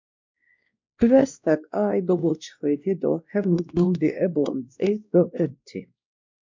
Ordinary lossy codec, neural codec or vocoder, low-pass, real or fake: MP3, 64 kbps; codec, 16 kHz, 1 kbps, X-Codec, WavLM features, trained on Multilingual LibriSpeech; 7.2 kHz; fake